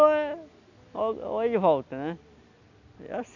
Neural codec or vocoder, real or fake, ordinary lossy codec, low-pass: none; real; none; 7.2 kHz